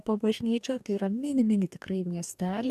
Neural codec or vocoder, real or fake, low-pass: codec, 44.1 kHz, 2.6 kbps, DAC; fake; 14.4 kHz